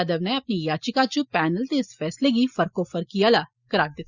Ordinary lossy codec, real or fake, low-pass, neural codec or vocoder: Opus, 64 kbps; real; 7.2 kHz; none